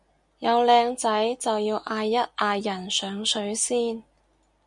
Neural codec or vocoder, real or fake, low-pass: none; real; 10.8 kHz